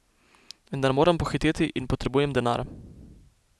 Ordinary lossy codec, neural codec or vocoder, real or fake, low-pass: none; none; real; none